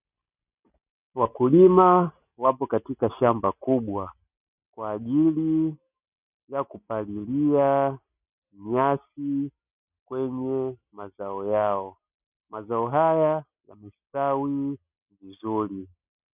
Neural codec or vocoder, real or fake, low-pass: none; real; 3.6 kHz